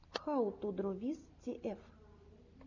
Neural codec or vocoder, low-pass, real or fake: none; 7.2 kHz; real